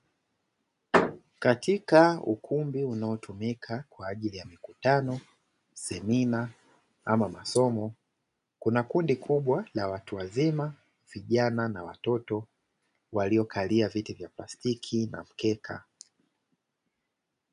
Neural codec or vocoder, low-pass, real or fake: none; 10.8 kHz; real